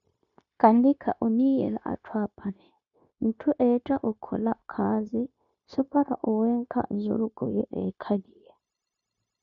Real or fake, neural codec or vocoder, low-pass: fake; codec, 16 kHz, 0.9 kbps, LongCat-Audio-Codec; 7.2 kHz